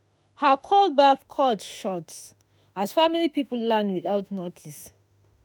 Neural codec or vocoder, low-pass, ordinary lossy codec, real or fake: autoencoder, 48 kHz, 32 numbers a frame, DAC-VAE, trained on Japanese speech; none; none; fake